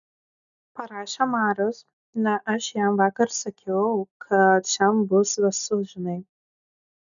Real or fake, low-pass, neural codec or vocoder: real; 7.2 kHz; none